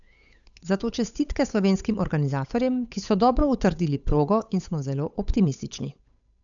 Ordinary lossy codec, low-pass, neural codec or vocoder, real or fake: none; 7.2 kHz; codec, 16 kHz, 8 kbps, FunCodec, trained on Chinese and English, 25 frames a second; fake